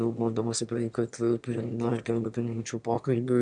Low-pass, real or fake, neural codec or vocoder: 9.9 kHz; fake; autoencoder, 22.05 kHz, a latent of 192 numbers a frame, VITS, trained on one speaker